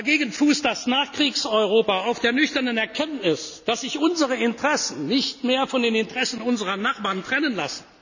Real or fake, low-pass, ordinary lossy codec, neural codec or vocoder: real; 7.2 kHz; none; none